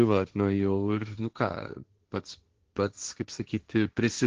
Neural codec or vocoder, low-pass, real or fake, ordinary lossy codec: codec, 16 kHz, 1.1 kbps, Voila-Tokenizer; 7.2 kHz; fake; Opus, 16 kbps